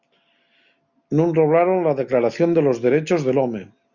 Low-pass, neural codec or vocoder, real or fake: 7.2 kHz; none; real